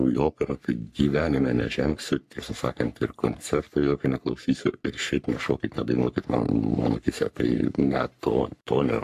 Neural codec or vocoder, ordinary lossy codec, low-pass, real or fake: codec, 44.1 kHz, 3.4 kbps, Pupu-Codec; AAC, 96 kbps; 14.4 kHz; fake